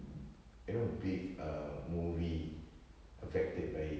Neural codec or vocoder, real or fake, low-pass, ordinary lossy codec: none; real; none; none